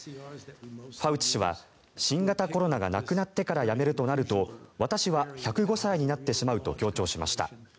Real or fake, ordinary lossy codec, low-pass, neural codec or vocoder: real; none; none; none